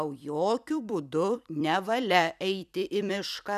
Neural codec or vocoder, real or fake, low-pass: vocoder, 44.1 kHz, 128 mel bands every 256 samples, BigVGAN v2; fake; 14.4 kHz